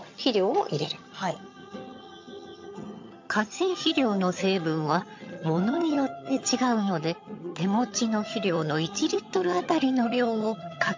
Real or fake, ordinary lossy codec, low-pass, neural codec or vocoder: fake; MP3, 48 kbps; 7.2 kHz; vocoder, 22.05 kHz, 80 mel bands, HiFi-GAN